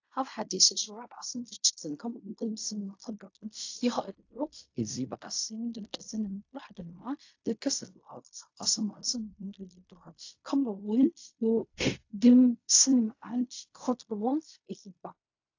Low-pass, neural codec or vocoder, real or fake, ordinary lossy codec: 7.2 kHz; codec, 16 kHz in and 24 kHz out, 0.4 kbps, LongCat-Audio-Codec, fine tuned four codebook decoder; fake; AAC, 48 kbps